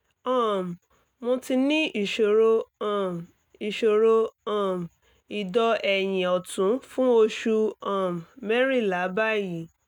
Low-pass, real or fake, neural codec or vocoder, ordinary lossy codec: 19.8 kHz; real; none; none